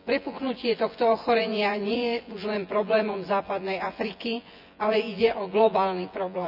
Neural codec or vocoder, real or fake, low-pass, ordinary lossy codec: vocoder, 24 kHz, 100 mel bands, Vocos; fake; 5.4 kHz; none